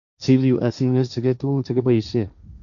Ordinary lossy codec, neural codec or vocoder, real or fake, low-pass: none; codec, 16 kHz, 1.1 kbps, Voila-Tokenizer; fake; 7.2 kHz